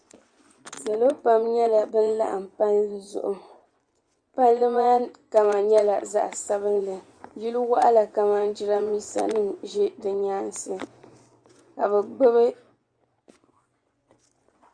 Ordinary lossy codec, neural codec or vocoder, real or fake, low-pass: Opus, 64 kbps; vocoder, 24 kHz, 100 mel bands, Vocos; fake; 9.9 kHz